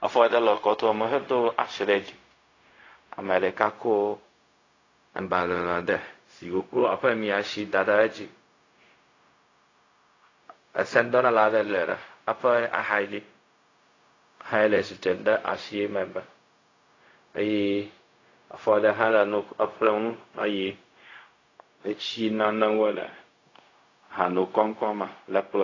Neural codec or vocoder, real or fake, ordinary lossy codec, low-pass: codec, 16 kHz, 0.4 kbps, LongCat-Audio-Codec; fake; AAC, 32 kbps; 7.2 kHz